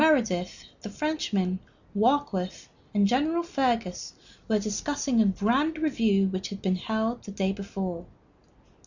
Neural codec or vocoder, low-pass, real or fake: none; 7.2 kHz; real